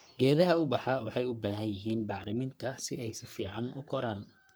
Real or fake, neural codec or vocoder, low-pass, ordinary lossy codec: fake; codec, 44.1 kHz, 3.4 kbps, Pupu-Codec; none; none